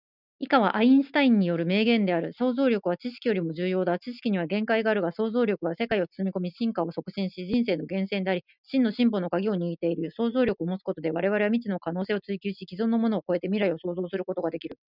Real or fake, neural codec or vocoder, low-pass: real; none; 5.4 kHz